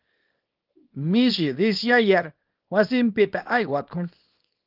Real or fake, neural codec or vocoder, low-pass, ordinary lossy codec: fake; codec, 24 kHz, 0.9 kbps, WavTokenizer, small release; 5.4 kHz; Opus, 32 kbps